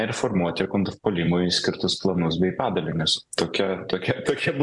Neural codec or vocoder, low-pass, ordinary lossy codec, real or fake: none; 10.8 kHz; MP3, 96 kbps; real